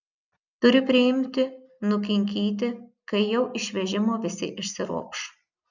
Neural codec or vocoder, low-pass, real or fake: none; 7.2 kHz; real